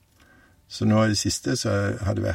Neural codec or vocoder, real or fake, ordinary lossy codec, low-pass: none; real; MP3, 64 kbps; 19.8 kHz